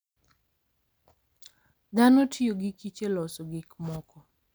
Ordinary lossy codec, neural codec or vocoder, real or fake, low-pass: none; none; real; none